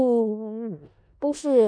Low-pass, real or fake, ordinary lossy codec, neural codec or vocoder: 9.9 kHz; fake; none; codec, 16 kHz in and 24 kHz out, 0.4 kbps, LongCat-Audio-Codec, four codebook decoder